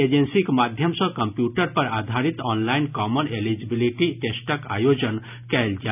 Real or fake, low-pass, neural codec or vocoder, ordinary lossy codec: real; 3.6 kHz; none; none